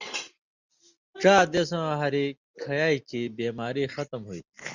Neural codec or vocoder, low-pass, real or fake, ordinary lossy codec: none; 7.2 kHz; real; Opus, 64 kbps